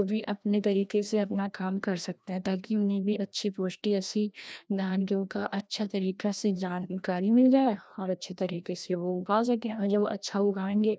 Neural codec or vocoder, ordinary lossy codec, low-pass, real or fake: codec, 16 kHz, 1 kbps, FreqCodec, larger model; none; none; fake